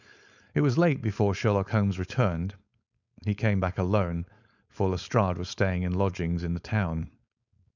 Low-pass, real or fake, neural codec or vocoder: 7.2 kHz; fake; codec, 16 kHz, 4.8 kbps, FACodec